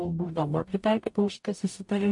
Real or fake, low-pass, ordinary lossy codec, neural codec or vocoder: fake; 10.8 kHz; MP3, 48 kbps; codec, 44.1 kHz, 0.9 kbps, DAC